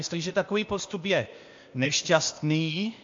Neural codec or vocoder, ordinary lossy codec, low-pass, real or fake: codec, 16 kHz, 0.8 kbps, ZipCodec; MP3, 48 kbps; 7.2 kHz; fake